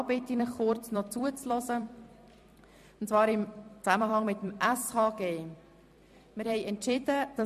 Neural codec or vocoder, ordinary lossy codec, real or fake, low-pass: vocoder, 44.1 kHz, 128 mel bands every 256 samples, BigVGAN v2; MP3, 64 kbps; fake; 14.4 kHz